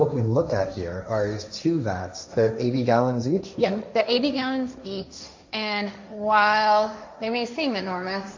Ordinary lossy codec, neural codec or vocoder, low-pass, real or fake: MP3, 48 kbps; codec, 16 kHz, 1.1 kbps, Voila-Tokenizer; 7.2 kHz; fake